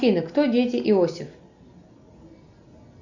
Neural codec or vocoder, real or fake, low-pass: none; real; 7.2 kHz